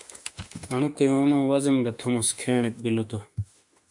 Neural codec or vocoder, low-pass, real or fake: autoencoder, 48 kHz, 32 numbers a frame, DAC-VAE, trained on Japanese speech; 10.8 kHz; fake